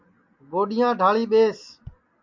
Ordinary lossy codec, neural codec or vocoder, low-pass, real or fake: MP3, 64 kbps; none; 7.2 kHz; real